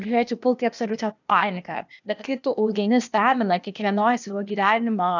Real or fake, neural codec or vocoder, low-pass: fake; codec, 16 kHz, 0.8 kbps, ZipCodec; 7.2 kHz